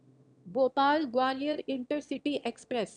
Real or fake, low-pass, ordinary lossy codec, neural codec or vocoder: fake; 9.9 kHz; none; autoencoder, 22.05 kHz, a latent of 192 numbers a frame, VITS, trained on one speaker